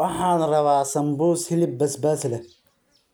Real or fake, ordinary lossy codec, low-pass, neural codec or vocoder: real; none; none; none